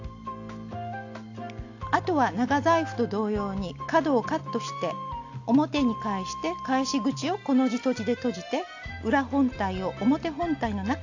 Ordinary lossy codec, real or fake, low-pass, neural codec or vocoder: AAC, 48 kbps; real; 7.2 kHz; none